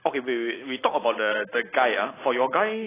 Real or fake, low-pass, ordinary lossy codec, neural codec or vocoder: real; 3.6 kHz; AAC, 16 kbps; none